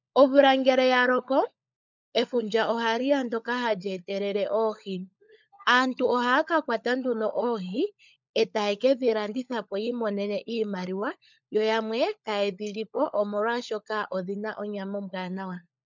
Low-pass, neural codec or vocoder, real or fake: 7.2 kHz; codec, 16 kHz, 16 kbps, FunCodec, trained on LibriTTS, 50 frames a second; fake